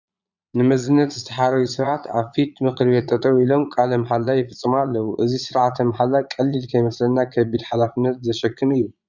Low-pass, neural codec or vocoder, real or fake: 7.2 kHz; vocoder, 22.05 kHz, 80 mel bands, Vocos; fake